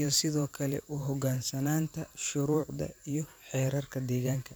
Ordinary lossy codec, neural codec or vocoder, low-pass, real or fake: none; vocoder, 44.1 kHz, 128 mel bands every 512 samples, BigVGAN v2; none; fake